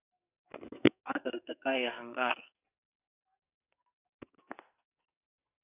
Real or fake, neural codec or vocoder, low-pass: fake; codec, 44.1 kHz, 2.6 kbps, SNAC; 3.6 kHz